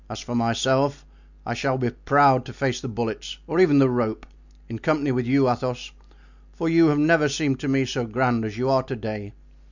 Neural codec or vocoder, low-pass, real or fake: none; 7.2 kHz; real